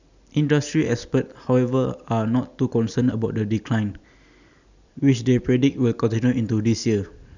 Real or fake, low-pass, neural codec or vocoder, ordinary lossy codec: real; 7.2 kHz; none; none